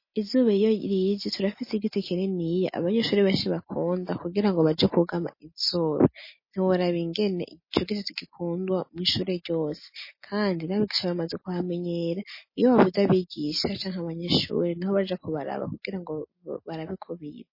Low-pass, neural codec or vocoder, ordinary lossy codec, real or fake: 5.4 kHz; none; MP3, 24 kbps; real